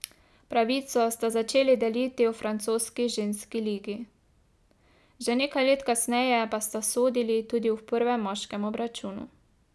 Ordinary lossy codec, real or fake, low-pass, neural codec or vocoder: none; real; none; none